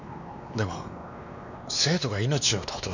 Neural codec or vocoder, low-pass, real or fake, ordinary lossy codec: codec, 16 kHz, 2 kbps, X-Codec, WavLM features, trained on Multilingual LibriSpeech; 7.2 kHz; fake; none